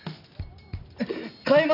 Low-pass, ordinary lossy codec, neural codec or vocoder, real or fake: 5.4 kHz; none; none; real